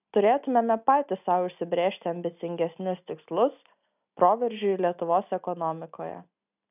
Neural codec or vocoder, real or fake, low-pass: none; real; 3.6 kHz